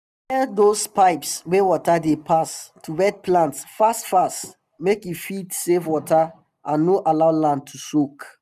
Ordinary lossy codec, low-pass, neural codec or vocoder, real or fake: none; 14.4 kHz; none; real